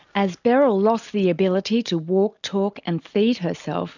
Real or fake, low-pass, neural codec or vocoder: real; 7.2 kHz; none